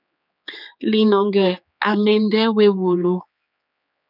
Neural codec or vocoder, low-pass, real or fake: codec, 16 kHz, 4 kbps, X-Codec, HuBERT features, trained on general audio; 5.4 kHz; fake